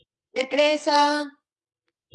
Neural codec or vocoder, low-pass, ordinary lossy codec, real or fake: codec, 24 kHz, 0.9 kbps, WavTokenizer, medium music audio release; 10.8 kHz; Opus, 24 kbps; fake